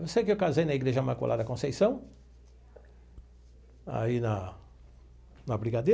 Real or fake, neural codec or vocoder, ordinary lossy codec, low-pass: real; none; none; none